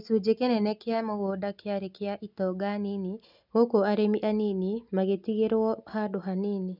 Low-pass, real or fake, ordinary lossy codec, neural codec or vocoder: 5.4 kHz; real; none; none